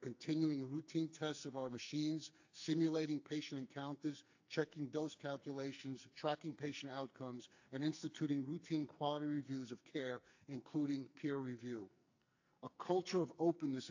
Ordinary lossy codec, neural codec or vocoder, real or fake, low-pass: AAC, 48 kbps; codec, 44.1 kHz, 2.6 kbps, SNAC; fake; 7.2 kHz